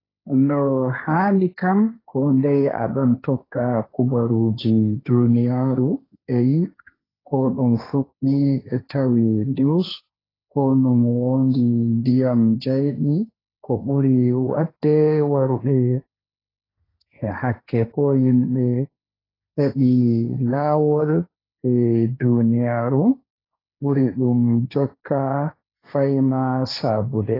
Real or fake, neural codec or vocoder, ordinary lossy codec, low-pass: fake; codec, 16 kHz, 1.1 kbps, Voila-Tokenizer; AAC, 24 kbps; 5.4 kHz